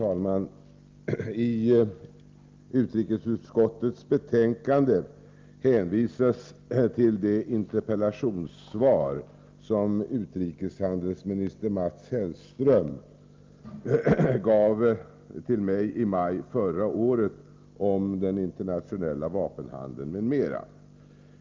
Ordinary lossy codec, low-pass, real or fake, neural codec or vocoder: Opus, 24 kbps; 7.2 kHz; real; none